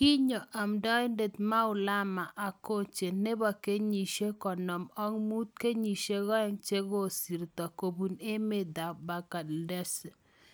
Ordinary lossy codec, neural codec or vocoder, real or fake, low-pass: none; none; real; none